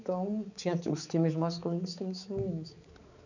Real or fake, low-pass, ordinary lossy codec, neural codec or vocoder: fake; 7.2 kHz; none; codec, 16 kHz, 4 kbps, X-Codec, HuBERT features, trained on balanced general audio